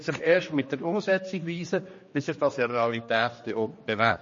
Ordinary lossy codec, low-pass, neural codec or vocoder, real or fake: MP3, 32 kbps; 7.2 kHz; codec, 16 kHz, 2 kbps, X-Codec, HuBERT features, trained on general audio; fake